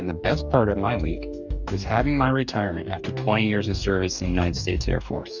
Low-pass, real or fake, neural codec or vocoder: 7.2 kHz; fake; codec, 44.1 kHz, 2.6 kbps, DAC